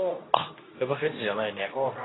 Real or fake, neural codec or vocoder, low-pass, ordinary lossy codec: fake; codec, 24 kHz, 0.9 kbps, WavTokenizer, medium speech release version 2; 7.2 kHz; AAC, 16 kbps